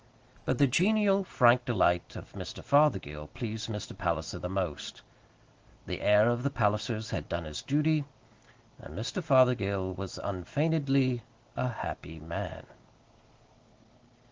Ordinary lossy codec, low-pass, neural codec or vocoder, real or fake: Opus, 16 kbps; 7.2 kHz; none; real